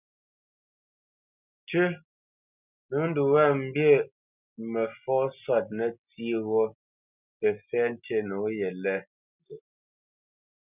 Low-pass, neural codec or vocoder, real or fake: 3.6 kHz; none; real